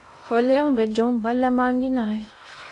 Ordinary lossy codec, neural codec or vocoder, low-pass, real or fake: AAC, 48 kbps; codec, 16 kHz in and 24 kHz out, 0.6 kbps, FocalCodec, streaming, 2048 codes; 10.8 kHz; fake